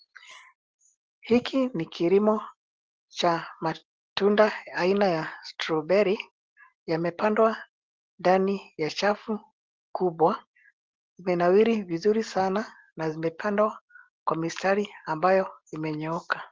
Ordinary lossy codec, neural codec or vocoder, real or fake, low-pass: Opus, 16 kbps; none; real; 7.2 kHz